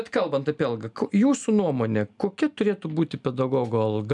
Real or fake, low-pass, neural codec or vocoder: real; 10.8 kHz; none